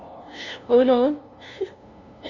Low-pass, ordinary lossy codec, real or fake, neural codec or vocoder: 7.2 kHz; none; fake; codec, 16 kHz, 0.5 kbps, FunCodec, trained on LibriTTS, 25 frames a second